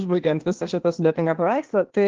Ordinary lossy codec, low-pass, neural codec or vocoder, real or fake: Opus, 16 kbps; 7.2 kHz; codec, 16 kHz, 0.8 kbps, ZipCodec; fake